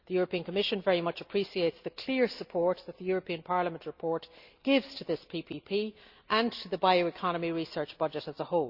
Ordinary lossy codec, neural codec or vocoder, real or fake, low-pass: Opus, 64 kbps; none; real; 5.4 kHz